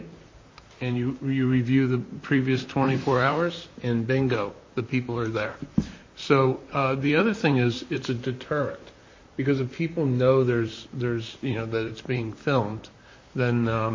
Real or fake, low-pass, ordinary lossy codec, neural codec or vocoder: fake; 7.2 kHz; MP3, 32 kbps; vocoder, 44.1 kHz, 128 mel bands, Pupu-Vocoder